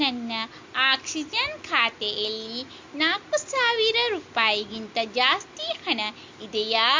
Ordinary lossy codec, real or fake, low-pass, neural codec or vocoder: MP3, 48 kbps; real; 7.2 kHz; none